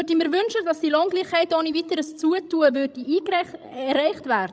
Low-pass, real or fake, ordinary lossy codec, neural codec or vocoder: none; fake; none; codec, 16 kHz, 16 kbps, FreqCodec, larger model